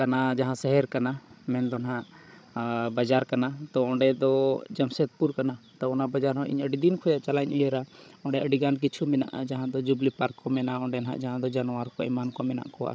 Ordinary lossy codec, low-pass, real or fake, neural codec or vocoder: none; none; fake; codec, 16 kHz, 16 kbps, FreqCodec, larger model